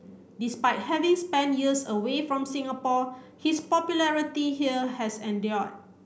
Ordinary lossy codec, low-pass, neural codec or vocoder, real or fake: none; none; none; real